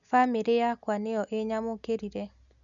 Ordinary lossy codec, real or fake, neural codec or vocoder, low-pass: none; real; none; 7.2 kHz